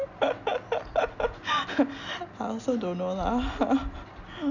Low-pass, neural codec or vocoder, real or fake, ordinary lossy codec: 7.2 kHz; none; real; none